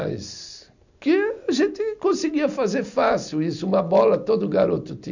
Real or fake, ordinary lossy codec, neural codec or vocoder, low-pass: real; none; none; 7.2 kHz